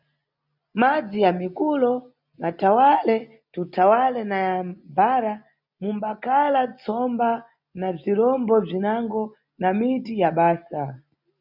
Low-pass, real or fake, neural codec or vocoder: 5.4 kHz; real; none